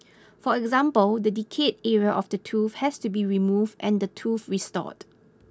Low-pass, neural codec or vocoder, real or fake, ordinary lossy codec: none; none; real; none